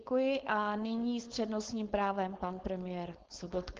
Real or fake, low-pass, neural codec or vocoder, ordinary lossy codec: fake; 7.2 kHz; codec, 16 kHz, 4.8 kbps, FACodec; Opus, 16 kbps